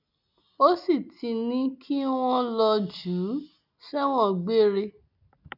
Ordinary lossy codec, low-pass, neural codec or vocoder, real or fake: none; 5.4 kHz; none; real